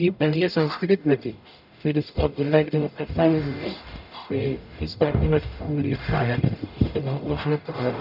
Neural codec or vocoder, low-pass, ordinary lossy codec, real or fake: codec, 44.1 kHz, 0.9 kbps, DAC; 5.4 kHz; none; fake